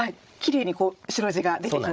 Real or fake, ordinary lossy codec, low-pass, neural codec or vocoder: fake; none; none; codec, 16 kHz, 16 kbps, FreqCodec, larger model